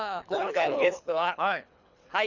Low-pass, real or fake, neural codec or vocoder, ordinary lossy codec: 7.2 kHz; fake; codec, 24 kHz, 3 kbps, HILCodec; none